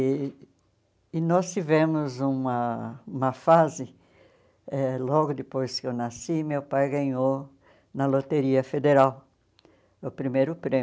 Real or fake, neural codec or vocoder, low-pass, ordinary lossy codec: real; none; none; none